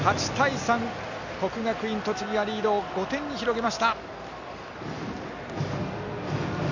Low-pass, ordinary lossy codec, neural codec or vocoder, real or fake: 7.2 kHz; none; none; real